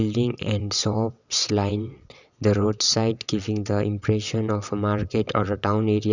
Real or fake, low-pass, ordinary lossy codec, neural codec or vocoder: fake; 7.2 kHz; none; vocoder, 22.05 kHz, 80 mel bands, WaveNeXt